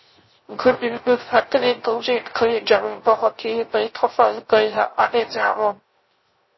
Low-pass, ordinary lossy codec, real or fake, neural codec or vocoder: 7.2 kHz; MP3, 24 kbps; fake; codec, 16 kHz, 0.3 kbps, FocalCodec